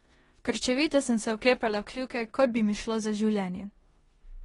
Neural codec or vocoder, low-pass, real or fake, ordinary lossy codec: codec, 16 kHz in and 24 kHz out, 0.9 kbps, LongCat-Audio-Codec, four codebook decoder; 10.8 kHz; fake; AAC, 32 kbps